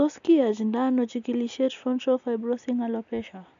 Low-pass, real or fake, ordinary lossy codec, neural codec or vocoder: 7.2 kHz; real; none; none